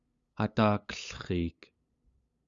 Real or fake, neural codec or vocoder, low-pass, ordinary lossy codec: fake; codec, 16 kHz, 8 kbps, FunCodec, trained on LibriTTS, 25 frames a second; 7.2 kHz; Opus, 64 kbps